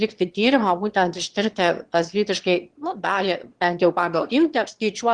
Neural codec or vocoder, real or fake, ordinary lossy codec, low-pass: autoencoder, 22.05 kHz, a latent of 192 numbers a frame, VITS, trained on one speaker; fake; Opus, 16 kbps; 9.9 kHz